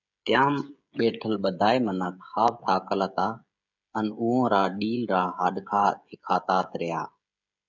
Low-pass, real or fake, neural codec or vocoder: 7.2 kHz; fake; codec, 16 kHz, 16 kbps, FreqCodec, smaller model